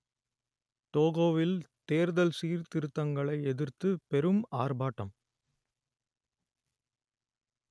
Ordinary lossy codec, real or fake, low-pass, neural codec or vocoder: none; real; none; none